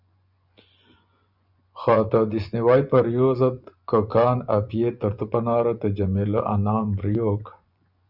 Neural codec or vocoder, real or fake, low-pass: vocoder, 24 kHz, 100 mel bands, Vocos; fake; 5.4 kHz